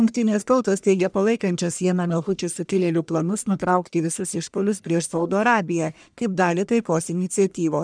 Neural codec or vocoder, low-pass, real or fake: codec, 44.1 kHz, 1.7 kbps, Pupu-Codec; 9.9 kHz; fake